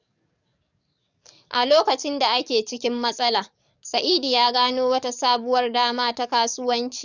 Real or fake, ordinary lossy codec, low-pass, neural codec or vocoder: fake; none; 7.2 kHz; codec, 44.1 kHz, 7.8 kbps, DAC